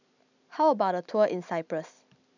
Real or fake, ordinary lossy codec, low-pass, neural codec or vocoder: real; none; 7.2 kHz; none